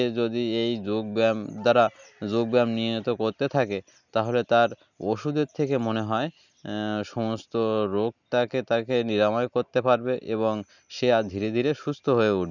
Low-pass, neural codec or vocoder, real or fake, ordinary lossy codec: 7.2 kHz; none; real; none